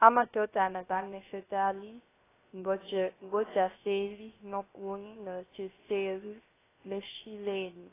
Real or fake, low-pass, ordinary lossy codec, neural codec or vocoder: fake; 3.6 kHz; AAC, 16 kbps; codec, 16 kHz, 0.3 kbps, FocalCodec